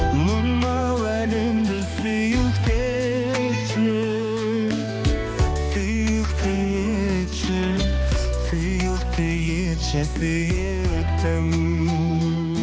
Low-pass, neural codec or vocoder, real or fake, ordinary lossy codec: none; codec, 16 kHz, 2 kbps, X-Codec, HuBERT features, trained on balanced general audio; fake; none